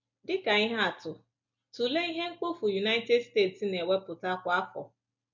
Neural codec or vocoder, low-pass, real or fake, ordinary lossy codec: none; 7.2 kHz; real; MP3, 64 kbps